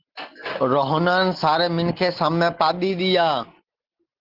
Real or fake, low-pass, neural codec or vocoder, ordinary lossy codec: real; 5.4 kHz; none; Opus, 16 kbps